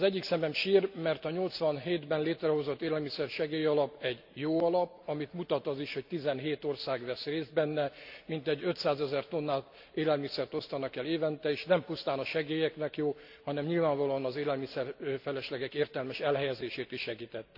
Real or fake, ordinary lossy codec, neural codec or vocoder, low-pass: real; AAC, 48 kbps; none; 5.4 kHz